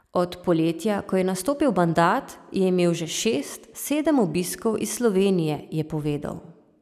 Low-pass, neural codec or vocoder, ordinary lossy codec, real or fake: 14.4 kHz; none; none; real